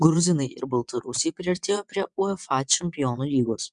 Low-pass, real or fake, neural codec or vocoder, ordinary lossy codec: 9.9 kHz; fake; vocoder, 22.05 kHz, 80 mel bands, WaveNeXt; MP3, 96 kbps